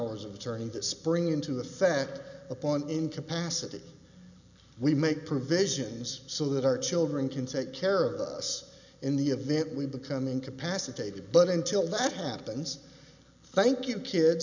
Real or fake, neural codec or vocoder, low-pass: real; none; 7.2 kHz